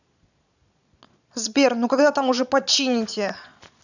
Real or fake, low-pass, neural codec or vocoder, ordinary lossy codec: fake; 7.2 kHz; vocoder, 22.05 kHz, 80 mel bands, Vocos; none